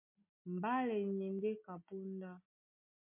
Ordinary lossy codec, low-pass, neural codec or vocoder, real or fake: MP3, 32 kbps; 3.6 kHz; none; real